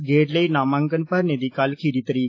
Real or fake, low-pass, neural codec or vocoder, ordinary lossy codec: real; 7.2 kHz; none; AAC, 32 kbps